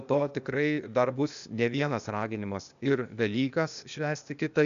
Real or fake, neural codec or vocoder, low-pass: fake; codec, 16 kHz, 0.8 kbps, ZipCodec; 7.2 kHz